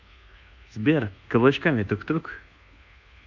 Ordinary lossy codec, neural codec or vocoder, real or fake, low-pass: none; codec, 24 kHz, 1.2 kbps, DualCodec; fake; 7.2 kHz